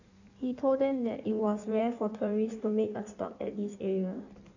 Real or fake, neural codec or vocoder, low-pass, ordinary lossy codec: fake; codec, 16 kHz in and 24 kHz out, 1.1 kbps, FireRedTTS-2 codec; 7.2 kHz; none